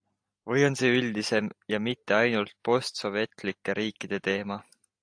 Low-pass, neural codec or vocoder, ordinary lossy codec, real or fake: 9.9 kHz; none; AAC, 64 kbps; real